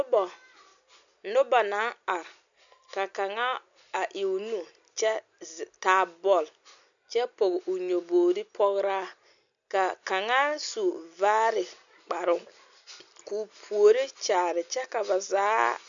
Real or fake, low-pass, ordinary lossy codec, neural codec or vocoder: real; 7.2 kHz; MP3, 96 kbps; none